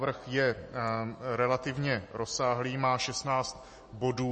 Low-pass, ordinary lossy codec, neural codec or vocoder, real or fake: 10.8 kHz; MP3, 32 kbps; none; real